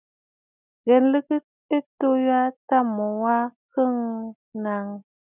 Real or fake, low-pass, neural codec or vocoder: real; 3.6 kHz; none